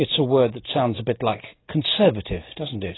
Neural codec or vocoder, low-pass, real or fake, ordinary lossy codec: none; 7.2 kHz; real; AAC, 16 kbps